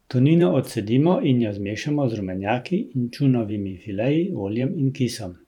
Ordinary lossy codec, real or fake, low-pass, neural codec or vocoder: none; fake; 19.8 kHz; vocoder, 44.1 kHz, 128 mel bands every 512 samples, BigVGAN v2